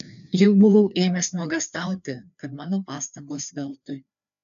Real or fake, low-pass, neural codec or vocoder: fake; 7.2 kHz; codec, 16 kHz, 2 kbps, FreqCodec, larger model